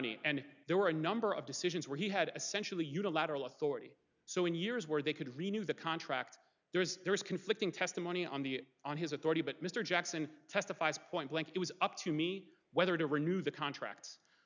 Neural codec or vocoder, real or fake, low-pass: none; real; 7.2 kHz